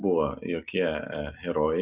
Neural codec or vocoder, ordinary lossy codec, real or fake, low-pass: none; Opus, 64 kbps; real; 3.6 kHz